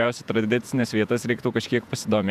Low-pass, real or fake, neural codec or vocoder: 14.4 kHz; fake; vocoder, 48 kHz, 128 mel bands, Vocos